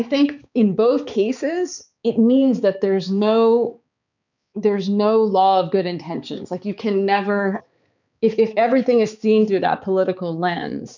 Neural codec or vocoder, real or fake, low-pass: codec, 16 kHz, 4 kbps, X-Codec, HuBERT features, trained on balanced general audio; fake; 7.2 kHz